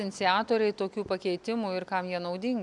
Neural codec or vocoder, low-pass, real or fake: none; 10.8 kHz; real